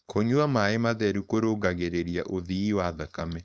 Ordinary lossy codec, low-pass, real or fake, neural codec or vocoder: none; none; fake; codec, 16 kHz, 4.8 kbps, FACodec